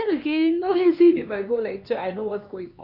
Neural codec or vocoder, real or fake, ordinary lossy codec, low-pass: codec, 16 kHz, 2 kbps, X-Codec, HuBERT features, trained on LibriSpeech; fake; none; 5.4 kHz